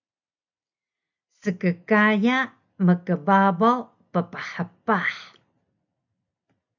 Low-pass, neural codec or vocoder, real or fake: 7.2 kHz; none; real